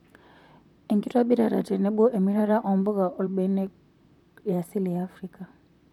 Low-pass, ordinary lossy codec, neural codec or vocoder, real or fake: 19.8 kHz; none; vocoder, 44.1 kHz, 128 mel bands, Pupu-Vocoder; fake